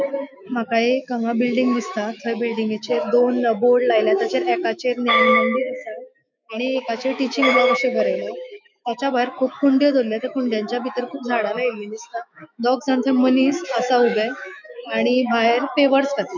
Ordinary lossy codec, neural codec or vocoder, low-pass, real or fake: none; autoencoder, 48 kHz, 128 numbers a frame, DAC-VAE, trained on Japanese speech; 7.2 kHz; fake